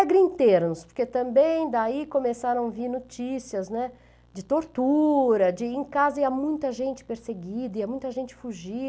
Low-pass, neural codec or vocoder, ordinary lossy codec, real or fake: none; none; none; real